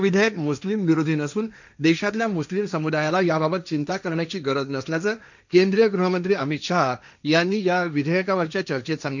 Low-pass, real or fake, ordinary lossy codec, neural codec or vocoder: 7.2 kHz; fake; none; codec, 16 kHz, 1.1 kbps, Voila-Tokenizer